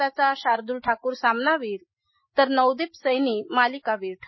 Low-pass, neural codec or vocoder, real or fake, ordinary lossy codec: 7.2 kHz; none; real; MP3, 24 kbps